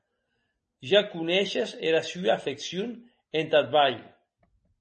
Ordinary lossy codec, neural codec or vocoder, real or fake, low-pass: MP3, 32 kbps; none; real; 9.9 kHz